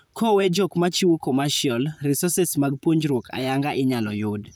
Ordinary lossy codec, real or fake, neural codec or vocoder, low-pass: none; fake; vocoder, 44.1 kHz, 128 mel bands, Pupu-Vocoder; none